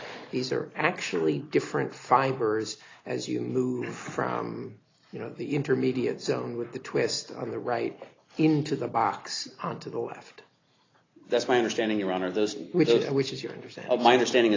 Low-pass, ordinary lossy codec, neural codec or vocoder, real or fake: 7.2 kHz; AAC, 32 kbps; none; real